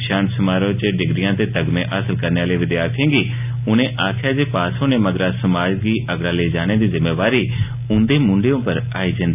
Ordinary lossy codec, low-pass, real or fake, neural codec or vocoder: AAC, 32 kbps; 3.6 kHz; real; none